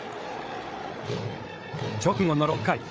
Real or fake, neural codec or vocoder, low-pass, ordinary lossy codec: fake; codec, 16 kHz, 8 kbps, FreqCodec, larger model; none; none